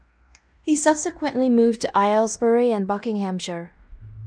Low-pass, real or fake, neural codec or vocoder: 9.9 kHz; fake; codec, 16 kHz in and 24 kHz out, 0.9 kbps, LongCat-Audio-Codec, fine tuned four codebook decoder